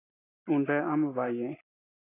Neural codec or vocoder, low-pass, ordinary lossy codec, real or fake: none; 3.6 kHz; AAC, 16 kbps; real